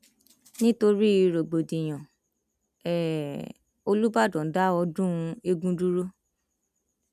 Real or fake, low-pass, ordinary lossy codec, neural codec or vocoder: real; 14.4 kHz; none; none